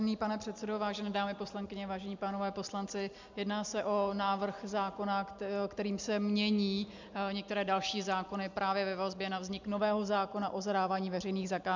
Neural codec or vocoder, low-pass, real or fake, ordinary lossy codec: none; 7.2 kHz; real; MP3, 64 kbps